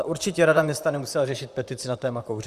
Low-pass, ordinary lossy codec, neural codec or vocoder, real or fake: 14.4 kHz; Opus, 64 kbps; vocoder, 44.1 kHz, 128 mel bands, Pupu-Vocoder; fake